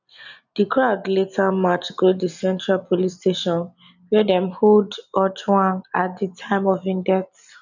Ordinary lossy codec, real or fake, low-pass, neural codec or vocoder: none; real; 7.2 kHz; none